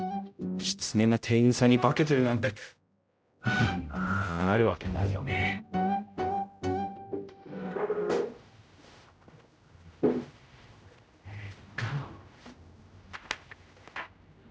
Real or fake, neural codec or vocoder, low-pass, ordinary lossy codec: fake; codec, 16 kHz, 0.5 kbps, X-Codec, HuBERT features, trained on general audio; none; none